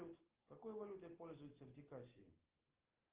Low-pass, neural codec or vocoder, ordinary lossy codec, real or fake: 3.6 kHz; none; Opus, 16 kbps; real